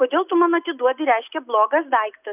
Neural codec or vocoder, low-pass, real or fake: none; 3.6 kHz; real